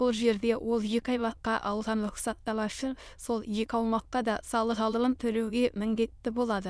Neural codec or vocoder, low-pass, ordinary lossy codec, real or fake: autoencoder, 22.05 kHz, a latent of 192 numbers a frame, VITS, trained on many speakers; none; none; fake